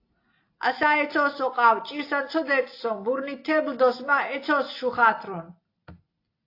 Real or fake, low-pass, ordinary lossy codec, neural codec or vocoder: real; 5.4 kHz; AAC, 32 kbps; none